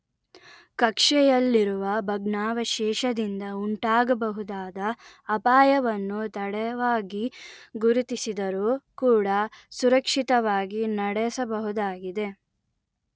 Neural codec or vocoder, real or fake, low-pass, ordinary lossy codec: none; real; none; none